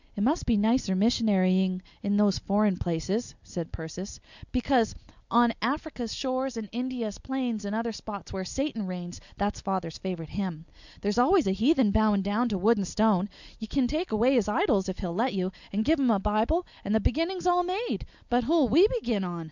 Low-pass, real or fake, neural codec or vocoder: 7.2 kHz; real; none